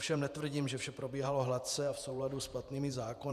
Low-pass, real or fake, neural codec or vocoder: 14.4 kHz; fake; vocoder, 48 kHz, 128 mel bands, Vocos